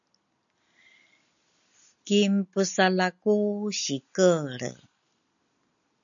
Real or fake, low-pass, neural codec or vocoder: real; 7.2 kHz; none